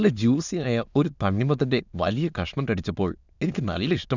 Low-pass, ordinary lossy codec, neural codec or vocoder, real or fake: 7.2 kHz; none; autoencoder, 22.05 kHz, a latent of 192 numbers a frame, VITS, trained on many speakers; fake